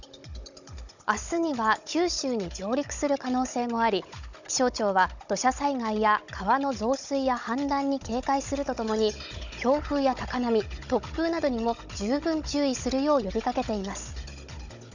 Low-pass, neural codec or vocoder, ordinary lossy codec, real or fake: 7.2 kHz; codec, 16 kHz, 16 kbps, FunCodec, trained on Chinese and English, 50 frames a second; none; fake